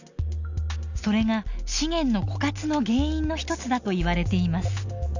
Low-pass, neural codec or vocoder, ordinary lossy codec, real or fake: 7.2 kHz; none; none; real